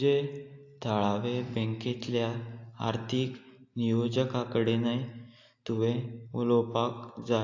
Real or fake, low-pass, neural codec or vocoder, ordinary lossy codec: real; 7.2 kHz; none; AAC, 48 kbps